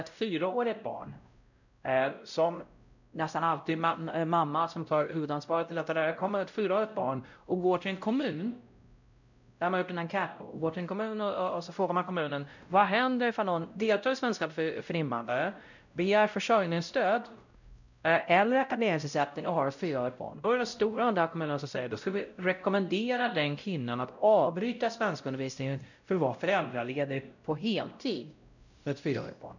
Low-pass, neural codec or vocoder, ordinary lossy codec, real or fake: 7.2 kHz; codec, 16 kHz, 0.5 kbps, X-Codec, WavLM features, trained on Multilingual LibriSpeech; none; fake